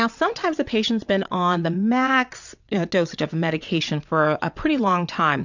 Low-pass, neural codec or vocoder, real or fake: 7.2 kHz; vocoder, 22.05 kHz, 80 mel bands, WaveNeXt; fake